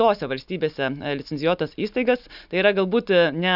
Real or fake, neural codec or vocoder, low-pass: real; none; 5.4 kHz